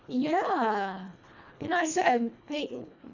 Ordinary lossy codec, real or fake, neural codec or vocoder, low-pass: none; fake; codec, 24 kHz, 1.5 kbps, HILCodec; 7.2 kHz